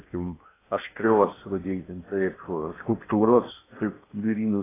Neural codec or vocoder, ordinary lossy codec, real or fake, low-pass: codec, 16 kHz in and 24 kHz out, 0.8 kbps, FocalCodec, streaming, 65536 codes; AAC, 16 kbps; fake; 3.6 kHz